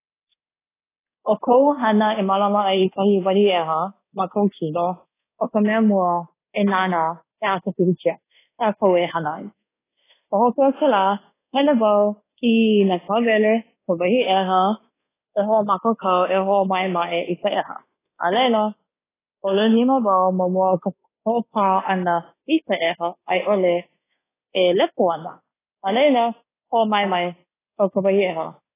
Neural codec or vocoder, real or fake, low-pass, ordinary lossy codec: codec, 24 kHz, 1.2 kbps, DualCodec; fake; 3.6 kHz; AAC, 16 kbps